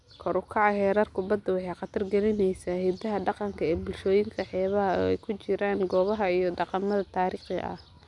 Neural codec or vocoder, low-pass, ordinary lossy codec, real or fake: none; 10.8 kHz; none; real